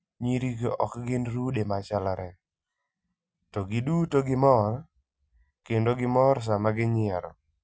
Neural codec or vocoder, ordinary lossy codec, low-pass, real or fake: none; none; none; real